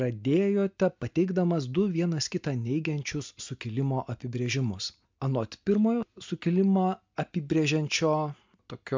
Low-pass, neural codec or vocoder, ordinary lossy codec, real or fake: 7.2 kHz; none; MP3, 64 kbps; real